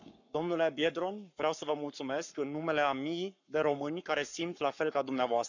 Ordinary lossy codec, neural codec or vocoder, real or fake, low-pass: none; codec, 44.1 kHz, 7.8 kbps, Pupu-Codec; fake; 7.2 kHz